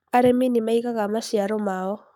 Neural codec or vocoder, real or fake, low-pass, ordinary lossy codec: codec, 44.1 kHz, 7.8 kbps, Pupu-Codec; fake; 19.8 kHz; none